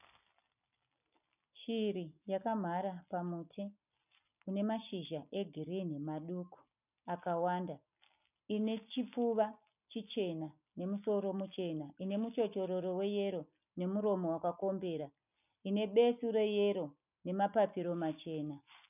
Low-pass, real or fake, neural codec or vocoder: 3.6 kHz; real; none